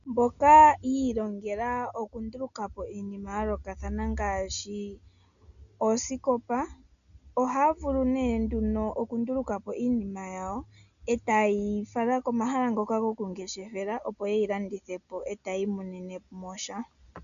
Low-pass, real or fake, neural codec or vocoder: 7.2 kHz; real; none